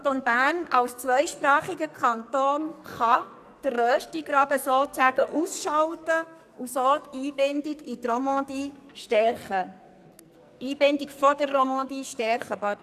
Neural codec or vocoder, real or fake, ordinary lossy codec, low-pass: codec, 44.1 kHz, 2.6 kbps, SNAC; fake; none; 14.4 kHz